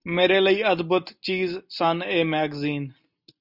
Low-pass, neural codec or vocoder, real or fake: 5.4 kHz; none; real